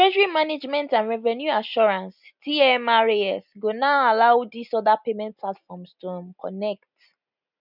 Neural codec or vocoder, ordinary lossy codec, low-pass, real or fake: none; none; 5.4 kHz; real